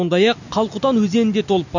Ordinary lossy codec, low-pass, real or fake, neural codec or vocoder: none; 7.2 kHz; real; none